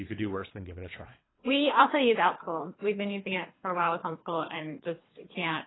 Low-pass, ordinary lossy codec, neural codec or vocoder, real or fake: 7.2 kHz; AAC, 16 kbps; codec, 24 kHz, 3 kbps, HILCodec; fake